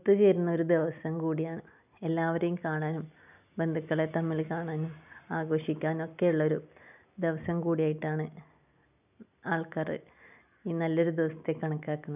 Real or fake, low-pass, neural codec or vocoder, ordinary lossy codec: real; 3.6 kHz; none; none